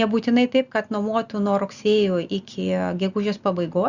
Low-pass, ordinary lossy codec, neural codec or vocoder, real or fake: 7.2 kHz; Opus, 64 kbps; none; real